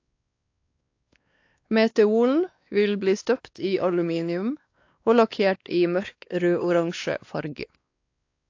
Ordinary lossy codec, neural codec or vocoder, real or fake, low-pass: AAC, 48 kbps; codec, 16 kHz, 2 kbps, X-Codec, WavLM features, trained on Multilingual LibriSpeech; fake; 7.2 kHz